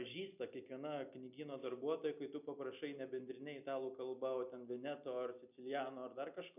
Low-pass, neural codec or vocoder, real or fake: 3.6 kHz; none; real